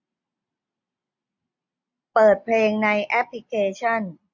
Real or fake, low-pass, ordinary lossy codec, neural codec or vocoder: real; 7.2 kHz; MP3, 48 kbps; none